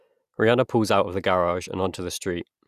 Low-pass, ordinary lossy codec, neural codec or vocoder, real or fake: 14.4 kHz; Opus, 64 kbps; none; real